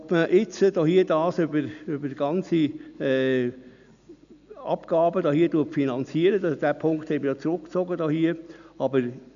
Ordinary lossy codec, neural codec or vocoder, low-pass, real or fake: none; none; 7.2 kHz; real